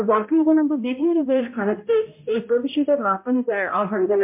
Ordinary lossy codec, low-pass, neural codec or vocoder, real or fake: MP3, 32 kbps; 3.6 kHz; codec, 16 kHz, 0.5 kbps, X-Codec, HuBERT features, trained on balanced general audio; fake